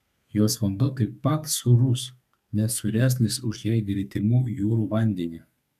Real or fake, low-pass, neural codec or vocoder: fake; 14.4 kHz; codec, 32 kHz, 1.9 kbps, SNAC